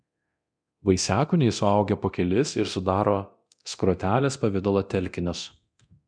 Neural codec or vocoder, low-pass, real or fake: codec, 24 kHz, 0.9 kbps, DualCodec; 9.9 kHz; fake